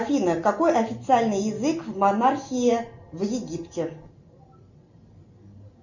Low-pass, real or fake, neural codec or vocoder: 7.2 kHz; real; none